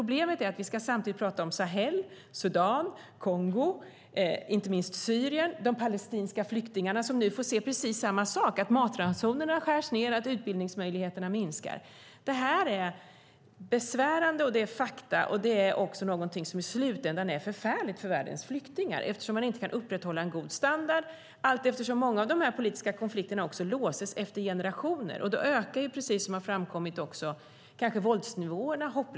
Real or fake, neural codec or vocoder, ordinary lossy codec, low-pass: real; none; none; none